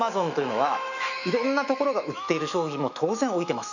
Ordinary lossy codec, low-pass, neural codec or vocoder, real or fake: none; 7.2 kHz; autoencoder, 48 kHz, 128 numbers a frame, DAC-VAE, trained on Japanese speech; fake